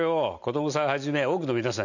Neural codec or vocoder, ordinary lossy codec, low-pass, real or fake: none; none; 7.2 kHz; real